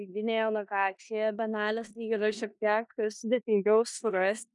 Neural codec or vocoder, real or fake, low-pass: codec, 16 kHz in and 24 kHz out, 0.9 kbps, LongCat-Audio-Codec, four codebook decoder; fake; 10.8 kHz